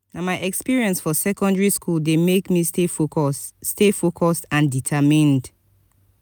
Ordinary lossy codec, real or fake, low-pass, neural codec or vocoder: none; real; none; none